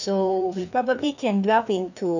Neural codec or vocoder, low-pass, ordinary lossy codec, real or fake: codec, 16 kHz, 2 kbps, FreqCodec, larger model; 7.2 kHz; none; fake